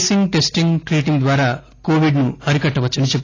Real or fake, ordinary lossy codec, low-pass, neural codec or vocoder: real; AAC, 32 kbps; 7.2 kHz; none